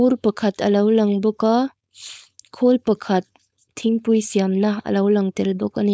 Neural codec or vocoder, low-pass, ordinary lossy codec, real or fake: codec, 16 kHz, 4.8 kbps, FACodec; none; none; fake